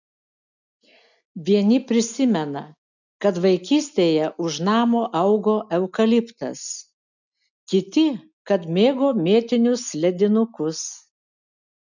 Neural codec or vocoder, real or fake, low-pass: none; real; 7.2 kHz